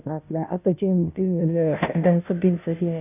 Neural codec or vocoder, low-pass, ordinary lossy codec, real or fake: codec, 16 kHz, 0.5 kbps, FunCodec, trained on Chinese and English, 25 frames a second; 3.6 kHz; none; fake